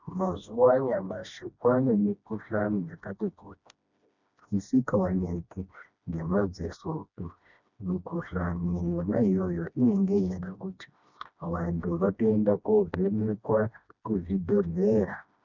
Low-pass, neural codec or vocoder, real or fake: 7.2 kHz; codec, 16 kHz, 1 kbps, FreqCodec, smaller model; fake